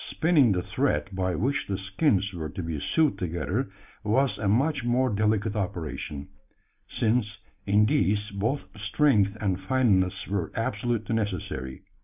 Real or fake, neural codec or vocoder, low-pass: real; none; 3.6 kHz